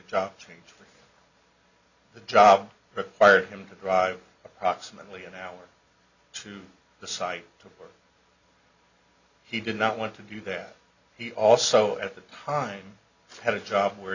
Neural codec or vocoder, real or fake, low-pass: none; real; 7.2 kHz